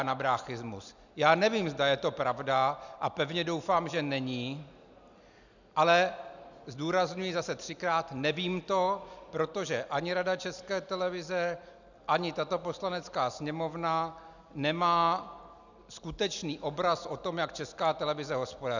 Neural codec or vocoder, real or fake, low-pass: none; real; 7.2 kHz